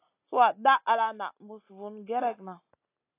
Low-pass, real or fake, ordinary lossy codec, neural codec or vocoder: 3.6 kHz; real; AAC, 24 kbps; none